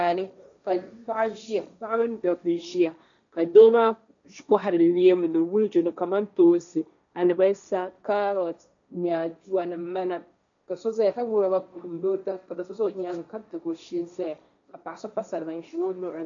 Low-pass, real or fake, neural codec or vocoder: 7.2 kHz; fake; codec, 16 kHz, 1.1 kbps, Voila-Tokenizer